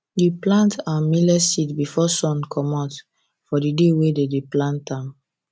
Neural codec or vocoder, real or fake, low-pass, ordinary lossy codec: none; real; none; none